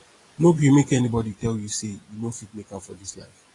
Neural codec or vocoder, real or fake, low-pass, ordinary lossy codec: vocoder, 24 kHz, 100 mel bands, Vocos; fake; 10.8 kHz; AAC, 32 kbps